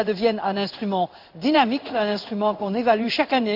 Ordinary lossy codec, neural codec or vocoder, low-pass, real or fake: none; codec, 16 kHz in and 24 kHz out, 1 kbps, XY-Tokenizer; 5.4 kHz; fake